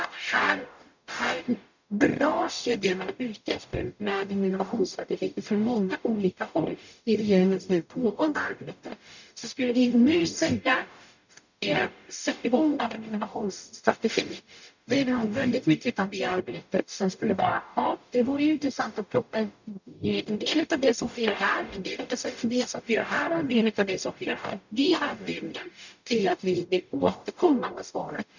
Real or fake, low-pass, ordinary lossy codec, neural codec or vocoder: fake; 7.2 kHz; none; codec, 44.1 kHz, 0.9 kbps, DAC